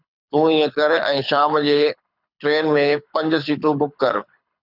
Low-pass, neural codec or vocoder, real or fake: 5.4 kHz; codec, 24 kHz, 6 kbps, HILCodec; fake